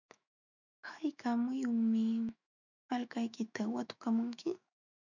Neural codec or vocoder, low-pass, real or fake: autoencoder, 48 kHz, 128 numbers a frame, DAC-VAE, trained on Japanese speech; 7.2 kHz; fake